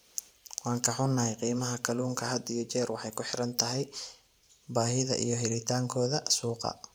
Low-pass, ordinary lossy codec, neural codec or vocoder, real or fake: none; none; vocoder, 44.1 kHz, 128 mel bands every 512 samples, BigVGAN v2; fake